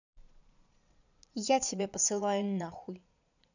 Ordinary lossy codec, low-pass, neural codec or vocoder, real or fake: none; 7.2 kHz; vocoder, 22.05 kHz, 80 mel bands, Vocos; fake